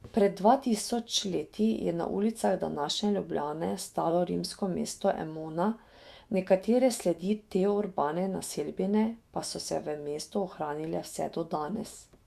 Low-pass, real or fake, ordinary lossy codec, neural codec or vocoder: 14.4 kHz; fake; Opus, 64 kbps; autoencoder, 48 kHz, 128 numbers a frame, DAC-VAE, trained on Japanese speech